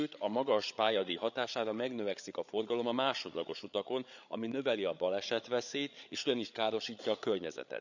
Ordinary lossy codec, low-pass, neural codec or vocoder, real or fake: none; 7.2 kHz; codec, 16 kHz, 8 kbps, FreqCodec, larger model; fake